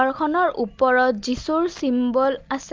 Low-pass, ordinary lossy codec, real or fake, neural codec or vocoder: 7.2 kHz; Opus, 24 kbps; real; none